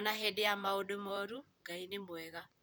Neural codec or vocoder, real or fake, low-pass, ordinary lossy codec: vocoder, 44.1 kHz, 128 mel bands, Pupu-Vocoder; fake; none; none